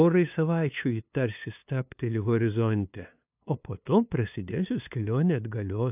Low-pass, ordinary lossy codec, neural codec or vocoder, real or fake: 3.6 kHz; AAC, 32 kbps; codec, 16 kHz, 2 kbps, FunCodec, trained on LibriTTS, 25 frames a second; fake